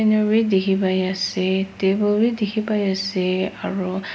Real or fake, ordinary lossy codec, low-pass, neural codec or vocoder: real; none; none; none